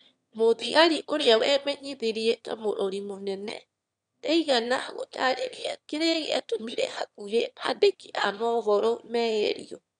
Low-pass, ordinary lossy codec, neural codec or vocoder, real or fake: 9.9 kHz; none; autoencoder, 22.05 kHz, a latent of 192 numbers a frame, VITS, trained on one speaker; fake